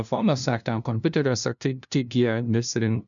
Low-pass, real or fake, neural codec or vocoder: 7.2 kHz; fake; codec, 16 kHz, 0.5 kbps, FunCodec, trained on LibriTTS, 25 frames a second